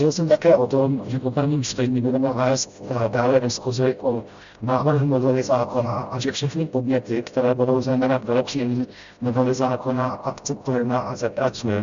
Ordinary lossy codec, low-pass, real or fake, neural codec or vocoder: Opus, 64 kbps; 7.2 kHz; fake; codec, 16 kHz, 0.5 kbps, FreqCodec, smaller model